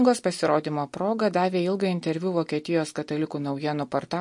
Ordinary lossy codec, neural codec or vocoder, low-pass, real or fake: MP3, 48 kbps; none; 10.8 kHz; real